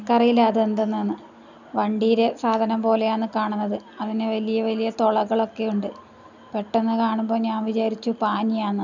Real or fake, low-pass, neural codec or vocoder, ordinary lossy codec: real; 7.2 kHz; none; none